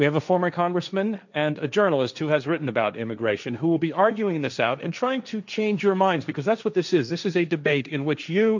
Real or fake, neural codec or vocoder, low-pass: fake; codec, 16 kHz, 1.1 kbps, Voila-Tokenizer; 7.2 kHz